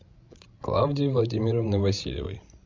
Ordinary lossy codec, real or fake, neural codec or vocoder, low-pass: MP3, 64 kbps; fake; codec, 16 kHz, 16 kbps, FreqCodec, larger model; 7.2 kHz